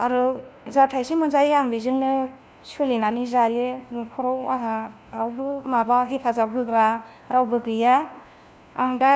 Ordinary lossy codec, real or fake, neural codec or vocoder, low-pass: none; fake; codec, 16 kHz, 1 kbps, FunCodec, trained on LibriTTS, 50 frames a second; none